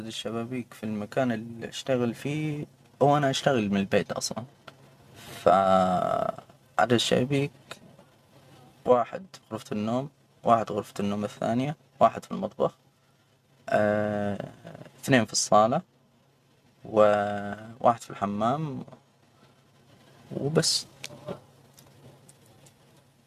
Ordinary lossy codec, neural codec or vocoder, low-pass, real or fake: none; none; 14.4 kHz; real